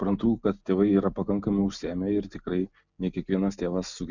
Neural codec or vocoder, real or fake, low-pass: none; real; 7.2 kHz